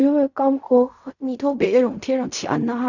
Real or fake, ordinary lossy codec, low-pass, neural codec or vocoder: fake; none; 7.2 kHz; codec, 16 kHz in and 24 kHz out, 0.4 kbps, LongCat-Audio-Codec, fine tuned four codebook decoder